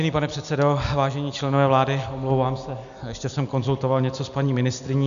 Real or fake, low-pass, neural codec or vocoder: real; 7.2 kHz; none